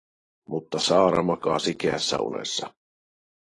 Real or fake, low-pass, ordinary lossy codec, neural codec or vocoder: real; 10.8 kHz; AAC, 32 kbps; none